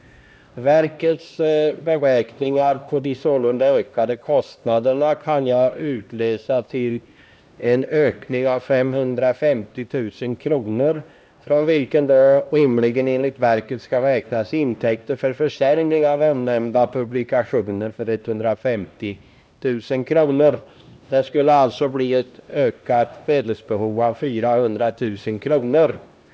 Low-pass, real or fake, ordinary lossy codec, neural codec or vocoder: none; fake; none; codec, 16 kHz, 1 kbps, X-Codec, HuBERT features, trained on LibriSpeech